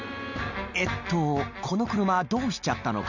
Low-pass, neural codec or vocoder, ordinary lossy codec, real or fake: 7.2 kHz; none; none; real